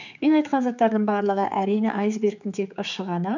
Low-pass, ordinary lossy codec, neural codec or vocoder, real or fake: 7.2 kHz; none; codec, 16 kHz, 4 kbps, X-Codec, HuBERT features, trained on general audio; fake